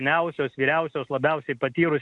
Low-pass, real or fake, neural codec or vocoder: 10.8 kHz; real; none